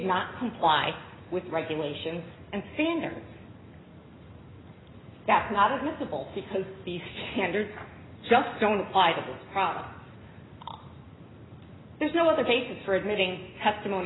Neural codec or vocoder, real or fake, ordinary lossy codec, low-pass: none; real; AAC, 16 kbps; 7.2 kHz